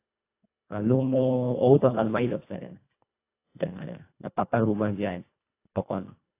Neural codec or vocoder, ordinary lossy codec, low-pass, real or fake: codec, 24 kHz, 1.5 kbps, HILCodec; AAC, 24 kbps; 3.6 kHz; fake